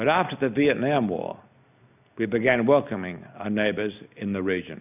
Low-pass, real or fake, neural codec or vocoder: 3.6 kHz; real; none